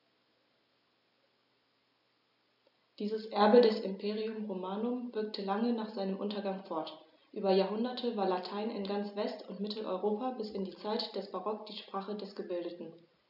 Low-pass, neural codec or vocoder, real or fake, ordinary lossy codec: 5.4 kHz; none; real; none